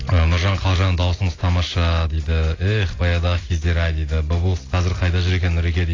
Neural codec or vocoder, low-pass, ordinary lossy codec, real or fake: none; 7.2 kHz; AAC, 32 kbps; real